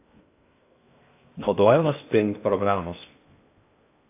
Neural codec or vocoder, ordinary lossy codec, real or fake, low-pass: codec, 16 kHz in and 24 kHz out, 0.6 kbps, FocalCodec, streaming, 2048 codes; AAC, 32 kbps; fake; 3.6 kHz